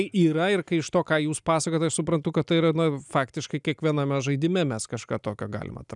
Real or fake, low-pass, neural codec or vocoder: real; 10.8 kHz; none